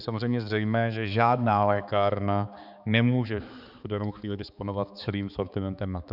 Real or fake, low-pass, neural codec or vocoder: fake; 5.4 kHz; codec, 16 kHz, 4 kbps, X-Codec, HuBERT features, trained on balanced general audio